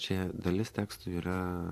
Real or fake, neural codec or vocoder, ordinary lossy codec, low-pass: fake; vocoder, 44.1 kHz, 128 mel bands every 512 samples, BigVGAN v2; AAC, 64 kbps; 14.4 kHz